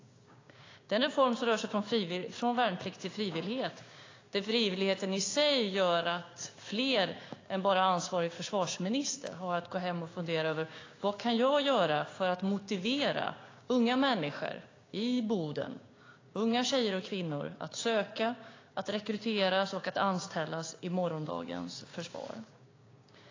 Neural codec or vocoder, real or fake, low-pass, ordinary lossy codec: codec, 16 kHz, 6 kbps, DAC; fake; 7.2 kHz; AAC, 32 kbps